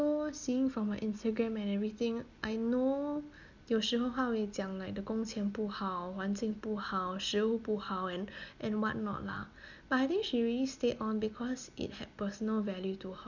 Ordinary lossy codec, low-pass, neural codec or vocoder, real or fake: none; 7.2 kHz; none; real